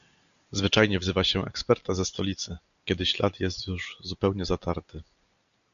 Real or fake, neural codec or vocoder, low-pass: real; none; 7.2 kHz